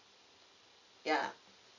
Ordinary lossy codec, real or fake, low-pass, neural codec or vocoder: none; real; 7.2 kHz; none